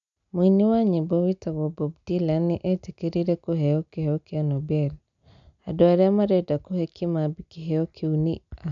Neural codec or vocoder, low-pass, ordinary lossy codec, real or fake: none; 7.2 kHz; none; real